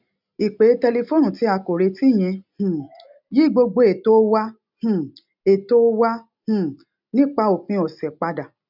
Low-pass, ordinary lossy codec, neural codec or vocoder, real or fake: 5.4 kHz; none; none; real